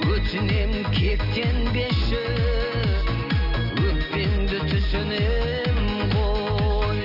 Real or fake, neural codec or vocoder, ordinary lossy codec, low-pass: real; none; none; 5.4 kHz